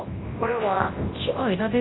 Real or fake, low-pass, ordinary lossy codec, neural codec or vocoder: fake; 7.2 kHz; AAC, 16 kbps; codec, 24 kHz, 0.9 kbps, WavTokenizer, large speech release